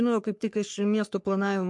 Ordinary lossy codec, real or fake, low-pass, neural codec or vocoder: MP3, 64 kbps; fake; 10.8 kHz; codec, 44.1 kHz, 3.4 kbps, Pupu-Codec